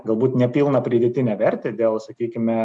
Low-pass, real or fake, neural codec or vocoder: 10.8 kHz; real; none